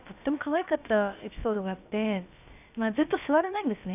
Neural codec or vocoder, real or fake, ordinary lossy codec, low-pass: codec, 16 kHz, about 1 kbps, DyCAST, with the encoder's durations; fake; none; 3.6 kHz